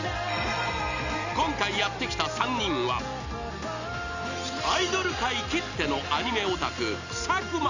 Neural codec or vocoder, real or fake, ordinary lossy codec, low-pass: none; real; none; 7.2 kHz